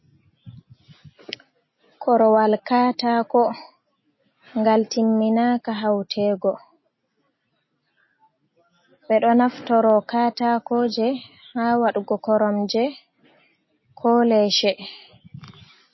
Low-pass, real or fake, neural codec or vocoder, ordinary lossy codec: 7.2 kHz; real; none; MP3, 24 kbps